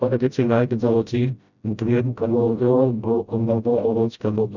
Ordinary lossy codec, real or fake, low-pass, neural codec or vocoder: none; fake; 7.2 kHz; codec, 16 kHz, 0.5 kbps, FreqCodec, smaller model